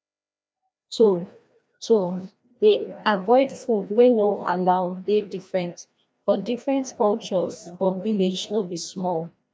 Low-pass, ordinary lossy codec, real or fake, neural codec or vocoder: none; none; fake; codec, 16 kHz, 1 kbps, FreqCodec, larger model